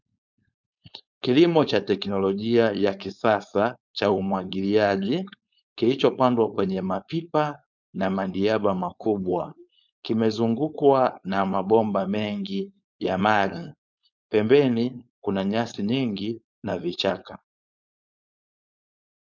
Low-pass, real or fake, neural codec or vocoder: 7.2 kHz; fake; codec, 16 kHz, 4.8 kbps, FACodec